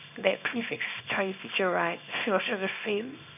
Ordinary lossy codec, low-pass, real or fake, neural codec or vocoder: none; 3.6 kHz; fake; codec, 24 kHz, 0.9 kbps, WavTokenizer, small release